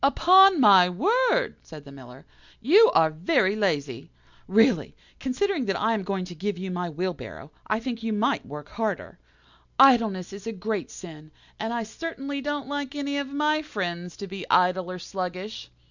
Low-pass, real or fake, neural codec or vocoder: 7.2 kHz; real; none